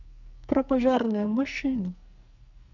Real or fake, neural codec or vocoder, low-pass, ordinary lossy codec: fake; codec, 44.1 kHz, 2.6 kbps, SNAC; 7.2 kHz; none